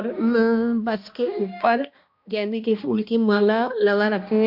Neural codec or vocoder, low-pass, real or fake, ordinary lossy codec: codec, 16 kHz, 1 kbps, X-Codec, HuBERT features, trained on balanced general audio; 5.4 kHz; fake; AAC, 48 kbps